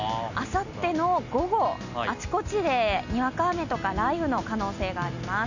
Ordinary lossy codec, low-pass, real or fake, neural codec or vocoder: none; 7.2 kHz; real; none